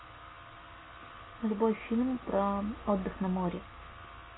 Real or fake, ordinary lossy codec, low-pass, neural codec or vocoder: real; AAC, 16 kbps; 7.2 kHz; none